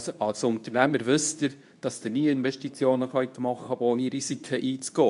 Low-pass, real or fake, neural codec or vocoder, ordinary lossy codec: 10.8 kHz; fake; codec, 24 kHz, 0.9 kbps, WavTokenizer, medium speech release version 2; MP3, 96 kbps